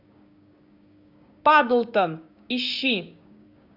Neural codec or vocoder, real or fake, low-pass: codec, 16 kHz, 6 kbps, DAC; fake; 5.4 kHz